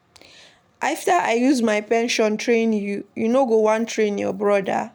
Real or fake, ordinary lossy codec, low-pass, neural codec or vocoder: real; none; none; none